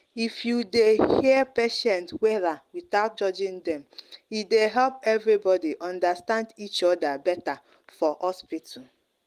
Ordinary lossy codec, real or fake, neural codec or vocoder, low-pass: Opus, 24 kbps; real; none; 14.4 kHz